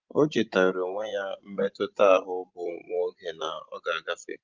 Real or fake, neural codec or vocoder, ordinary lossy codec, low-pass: fake; vocoder, 24 kHz, 100 mel bands, Vocos; Opus, 32 kbps; 7.2 kHz